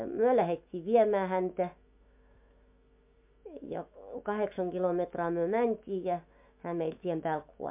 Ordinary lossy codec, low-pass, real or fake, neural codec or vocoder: MP3, 32 kbps; 3.6 kHz; fake; autoencoder, 48 kHz, 128 numbers a frame, DAC-VAE, trained on Japanese speech